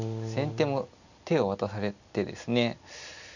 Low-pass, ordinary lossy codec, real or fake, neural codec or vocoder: 7.2 kHz; none; real; none